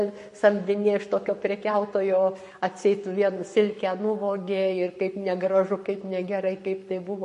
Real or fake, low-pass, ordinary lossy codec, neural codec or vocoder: fake; 14.4 kHz; MP3, 48 kbps; codec, 44.1 kHz, 7.8 kbps, Pupu-Codec